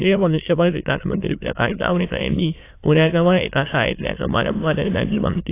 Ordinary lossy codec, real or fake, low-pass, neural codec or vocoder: AAC, 24 kbps; fake; 3.6 kHz; autoencoder, 22.05 kHz, a latent of 192 numbers a frame, VITS, trained on many speakers